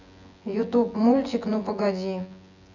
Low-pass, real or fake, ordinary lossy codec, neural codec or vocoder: 7.2 kHz; fake; none; vocoder, 24 kHz, 100 mel bands, Vocos